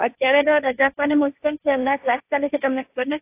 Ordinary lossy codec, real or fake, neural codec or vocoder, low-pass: AAC, 24 kbps; fake; codec, 16 kHz, 1.1 kbps, Voila-Tokenizer; 3.6 kHz